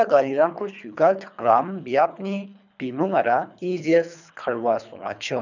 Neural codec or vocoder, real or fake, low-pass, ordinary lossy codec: codec, 24 kHz, 3 kbps, HILCodec; fake; 7.2 kHz; none